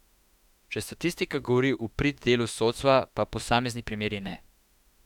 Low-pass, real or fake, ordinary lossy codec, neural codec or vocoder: 19.8 kHz; fake; none; autoencoder, 48 kHz, 32 numbers a frame, DAC-VAE, trained on Japanese speech